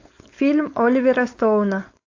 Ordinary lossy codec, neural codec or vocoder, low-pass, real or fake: AAC, 48 kbps; codec, 16 kHz, 4.8 kbps, FACodec; 7.2 kHz; fake